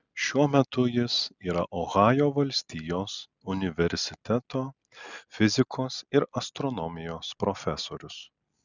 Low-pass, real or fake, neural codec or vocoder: 7.2 kHz; real; none